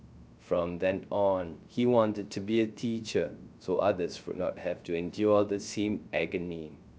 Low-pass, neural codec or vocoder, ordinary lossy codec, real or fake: none; codec, 16 kHz, 0.3 kbps, FocalCodec; none; fake